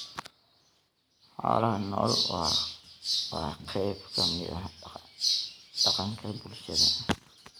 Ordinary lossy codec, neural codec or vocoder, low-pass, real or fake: none; none; none; real